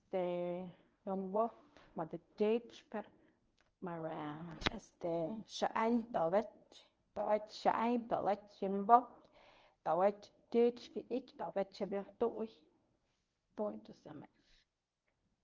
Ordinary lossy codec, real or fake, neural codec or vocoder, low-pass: Opus, 24 kbps; fake; codec, 24 kHz, 0.9 kbps, WavTokenizer, medium speech release version 1; 7.2 kHz